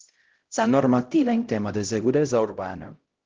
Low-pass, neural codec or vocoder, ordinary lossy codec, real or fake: 7.2 kHz; codec, 16 kHz, 0.5 kbps, X-Codec, HuBERT features, trained on LibriSpeech; Opus, 16 kbps; fake